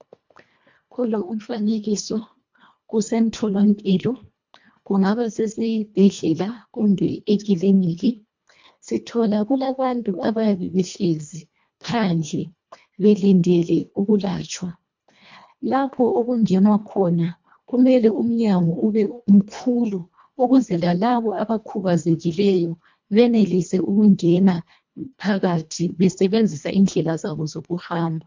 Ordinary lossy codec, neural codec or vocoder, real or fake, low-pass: MP3, 64 kbps; codec, 24 kHz, 1.5 kbps, HILCodec; fake; 7.2 kHz